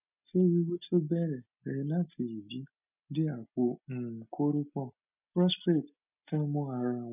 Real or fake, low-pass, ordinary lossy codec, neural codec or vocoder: real; 3.6 kHz; none; none